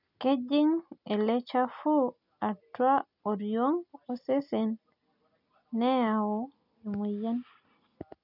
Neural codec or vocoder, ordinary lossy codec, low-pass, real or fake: none; none; 5.4 kHz; real